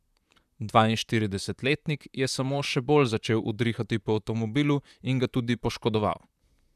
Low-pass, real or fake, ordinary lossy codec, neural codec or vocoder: 14.4 kHz; fake; none; vocoder, 44.1 kHz, 128 mel bands, Pupu-Vocoder